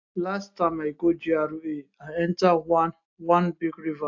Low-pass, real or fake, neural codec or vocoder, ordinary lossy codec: 7.2 kHz; real; none; AAC, 48 kbps